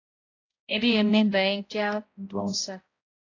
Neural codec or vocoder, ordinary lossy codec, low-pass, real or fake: codec, 16 kHz, 0.5 kbps, X-Codec, HuBERT features, trained on balanced general audio; AAC, 32 kbps; 7.2 kHz; fake